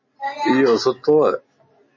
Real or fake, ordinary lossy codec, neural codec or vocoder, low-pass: real; MP3, 32 kbps; none; 7.2 kHz